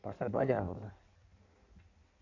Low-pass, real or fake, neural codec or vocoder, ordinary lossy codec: 7.2 kHz; fake; codec, 16 kHz in and 24 kHz out, 1.1 kbps, FireRedTTS-2 codec; none